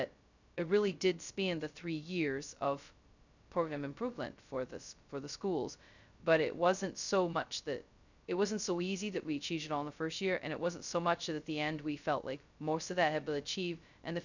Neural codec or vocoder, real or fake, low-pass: codec, 16 kHz, 0.2 kbps, FocalCodec; fake; 7.2 kHz